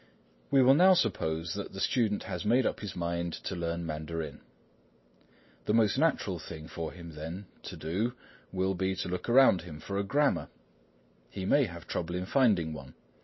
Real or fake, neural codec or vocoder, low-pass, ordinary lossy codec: real; none; 7.2 kHz; MP3, 24 kbps